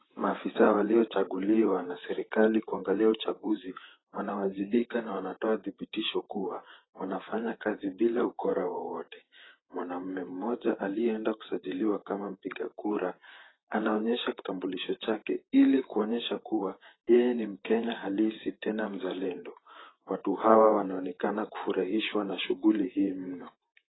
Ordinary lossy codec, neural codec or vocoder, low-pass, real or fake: AAC, 16 kbps; vocoder, 44.1 kHz, 128 mel bands, Pupu-Vocoder; 7.2 kHz; fake